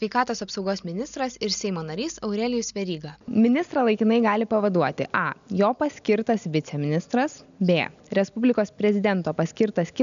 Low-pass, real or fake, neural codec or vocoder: 7.2 kHz; real; none